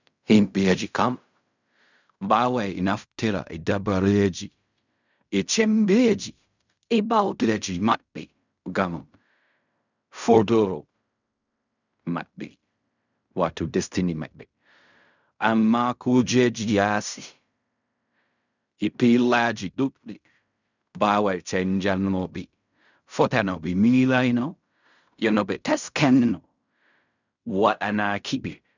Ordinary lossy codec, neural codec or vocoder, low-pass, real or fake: none; codec, 16 kHz in and 24 kHz out, 0.4 kbps, LongCat-Audio-Codec, fine tuned four codebook decoder; 7.2 kHz; fake